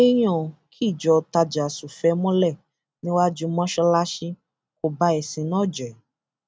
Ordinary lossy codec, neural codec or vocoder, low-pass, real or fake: none; none; none; real